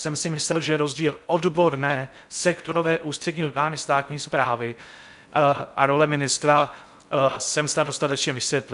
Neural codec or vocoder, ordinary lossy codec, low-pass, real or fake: codec, 16 kHz in and 24 kHz out, 0.6 kbps, FocalCodec, streaming, 4096 codes; MP3, 96 kbps; 10.8 kHz; fake